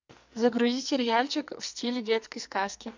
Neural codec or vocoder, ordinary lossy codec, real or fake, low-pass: codec, 44.1 kHz, 2.6 kbps, SNAC; MP3, 64 kbps; fake; 7.2 kHz